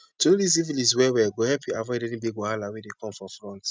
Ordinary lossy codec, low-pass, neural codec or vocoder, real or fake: none; none; none; real